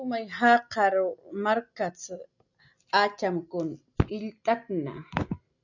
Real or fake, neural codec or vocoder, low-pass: real; none; 7.2 kHz